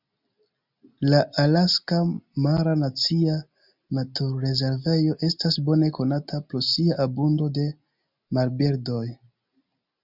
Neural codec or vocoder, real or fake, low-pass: none; real; 5.4 kHz